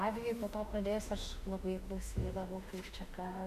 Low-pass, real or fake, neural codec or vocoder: 14.4 kHz; fake; autoencoder, 48 kHz, 32 numbers a frame, DAC-VAE, trained on Japanese speech